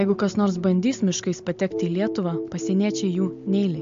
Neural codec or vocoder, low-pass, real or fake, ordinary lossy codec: none; 7.2 kHz; real; MP3, 48 kbps